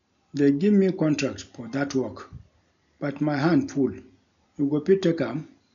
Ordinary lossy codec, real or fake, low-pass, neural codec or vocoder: MP3, 96 kbps; real; 7.2 kHz; none